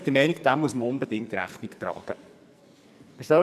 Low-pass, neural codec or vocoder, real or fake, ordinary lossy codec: 14.4 kHz; codec, 44.1 kHz, 2.6 kbps, SNAC; fake; none